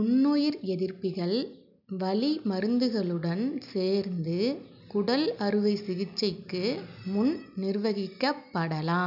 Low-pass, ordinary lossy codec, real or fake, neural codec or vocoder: 5.4 kHz; none; real; none